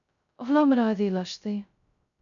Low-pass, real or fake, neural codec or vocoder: 7.2 kHz; fake; codec, 16 kHz, 0.2 kbps, FocalCodec